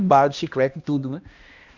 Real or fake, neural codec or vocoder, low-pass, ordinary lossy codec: fake; codec, 16 kHz, 1 kbps, X-Codec, HuBERT features, trained on balanced general audio; 7.2 kHz; Opus, 64 kbps